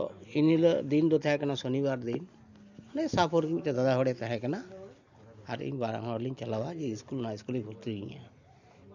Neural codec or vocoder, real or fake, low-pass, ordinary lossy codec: none; real; 7.2 kHz; none